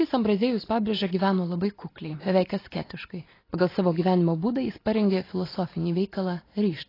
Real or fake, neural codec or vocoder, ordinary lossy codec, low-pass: real; none; AAC, 24 kbps; 5.4 kHz